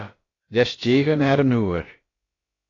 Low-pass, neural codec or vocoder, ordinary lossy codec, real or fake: 7.2 kHz; codec, 16 kHz, about 1 kbps, DyCAST, with the encoder's durations; AAC, 32 kbps; fake